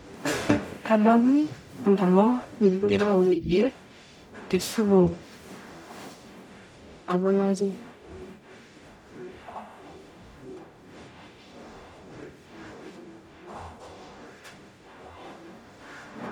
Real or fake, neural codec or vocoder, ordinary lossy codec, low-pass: fake; codec, 44.1 kHz, 0.9 kbps, DAC; none; 19.8 kHz